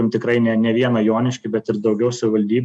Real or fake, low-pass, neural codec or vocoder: real; 9.9 kHz; none